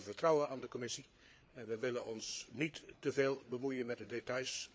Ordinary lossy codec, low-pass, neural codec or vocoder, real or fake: none; none; codec, 16 kHz, 4 kbps, FreqCodec, larger model; fake